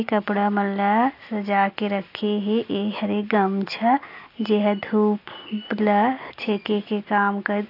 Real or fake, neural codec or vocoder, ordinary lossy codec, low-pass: real; none; AAC, 32 kbps; 5.4 kHz